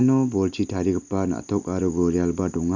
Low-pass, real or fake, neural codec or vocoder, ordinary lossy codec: 7.2 kHz; real; none; none